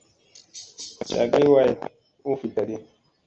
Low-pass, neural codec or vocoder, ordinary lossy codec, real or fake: 7.2 kHz; none; Opus, 24 kbps; real